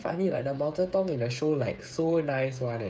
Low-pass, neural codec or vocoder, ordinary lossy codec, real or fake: none; codec, 16 kHz, 8 kbps, FreqCodec, smaller model; none; fake